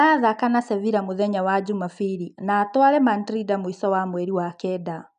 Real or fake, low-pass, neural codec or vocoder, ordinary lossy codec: real; 9.9 kHz; none; none